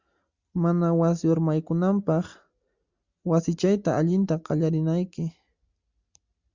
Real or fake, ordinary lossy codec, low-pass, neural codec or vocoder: real; Opus, 64 kbps; 7.2 kHz; none